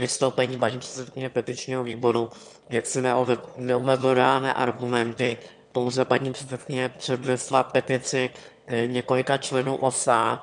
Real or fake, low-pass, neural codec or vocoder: fake; 9.9 kHz; autoencoder, 22.05 kHz, a latent of 192 numbers a frame, VITS, trained on one speaker